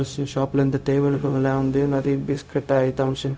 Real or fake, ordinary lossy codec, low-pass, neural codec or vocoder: fake; none; none; codec, 16 kHz, 0.4 kbps, LongCat-Audio-Codec